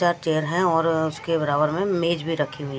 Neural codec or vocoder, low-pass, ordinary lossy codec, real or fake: none; none; none; real